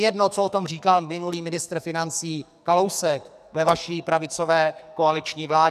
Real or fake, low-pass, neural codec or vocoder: fake; 14.4 kHz; codec, 44.1 kHz, 2.6 kbps, SNAC